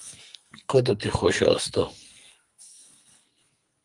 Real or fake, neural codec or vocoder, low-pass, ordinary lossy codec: fake; codec, 44.1 kHz, 2.6 kbps, SNAC; 10.8 kHz; Opus, 32 kbps